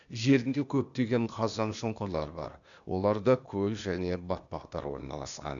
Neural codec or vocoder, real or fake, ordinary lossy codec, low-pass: codec, 16 kHz, 0.8 kbps, ZipCodec; fake; none; 7.2 kHz